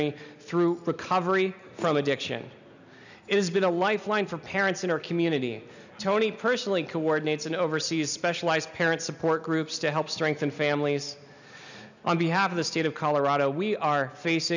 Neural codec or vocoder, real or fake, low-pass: none; real; 7.2 kHz